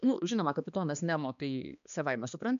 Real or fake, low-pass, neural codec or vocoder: fake; 7.2 kHz; codec, 16 kHz, 2 kbps, X-Codec, HuBERT features, trained on balanced general audio